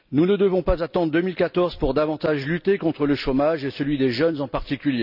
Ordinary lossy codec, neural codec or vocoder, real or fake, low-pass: none; none; real; 5.4 kHz